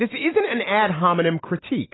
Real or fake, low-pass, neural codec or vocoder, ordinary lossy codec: real; 7.2 kHz; none; AAC, 16 kbps